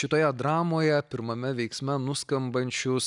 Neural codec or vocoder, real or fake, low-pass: none; real; 10.8 kHz